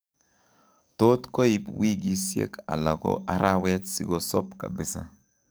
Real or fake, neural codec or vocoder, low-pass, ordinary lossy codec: fake; codec, 44.1 kHz, 7.8 kbps, DAC; none; none